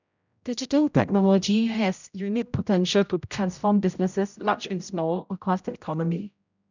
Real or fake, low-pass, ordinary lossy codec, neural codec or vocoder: fake; 7.2 kHz; none; codec, 16 kHz, 0.5 kbps, X-Codec, HuBERT features, trained on general audio